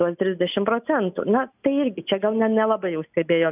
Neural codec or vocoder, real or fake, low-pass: none; real; 3.6 kHz